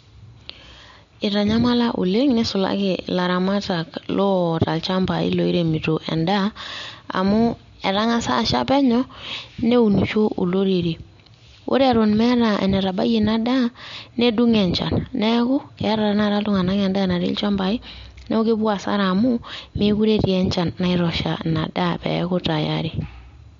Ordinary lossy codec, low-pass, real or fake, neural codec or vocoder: MP3, 48 kbps; 7.2 kHz; real; none